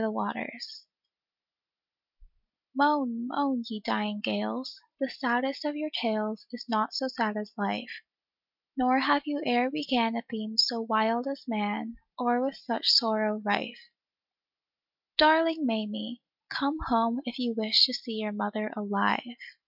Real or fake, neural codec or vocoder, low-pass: real; none; 5.4 kHz